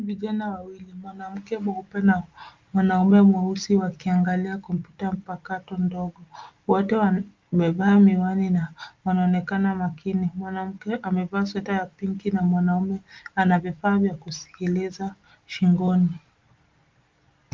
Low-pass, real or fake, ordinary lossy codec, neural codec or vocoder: 7.2 kHz; real; Opus, 32 kbps; none